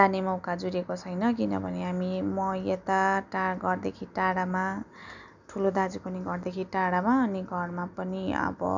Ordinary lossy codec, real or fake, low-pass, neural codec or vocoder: none; real; 7.2 kHz; none